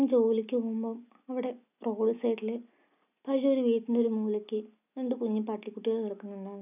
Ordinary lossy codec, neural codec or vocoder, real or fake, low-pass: none; none; real; 3.6 kHz